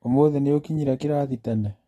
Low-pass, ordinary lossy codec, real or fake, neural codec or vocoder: 19.8 kHz; AAC, 32 kbps; real; none